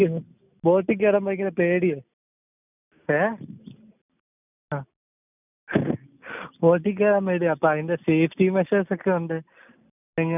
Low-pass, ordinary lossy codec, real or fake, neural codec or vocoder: 3.6 kHz; none; real; none